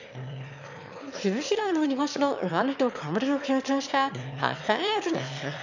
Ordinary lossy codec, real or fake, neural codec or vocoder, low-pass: none; fake; autoencoder, 22.05 kHz, a latent of 192 numbers a frame, VITS, trained on one speaker; 7.2 kHz